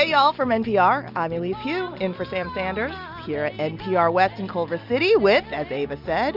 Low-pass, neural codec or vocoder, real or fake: 5.4 kHz; none; real